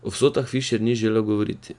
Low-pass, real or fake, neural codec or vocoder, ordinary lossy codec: 10.8 kHz; real; none; none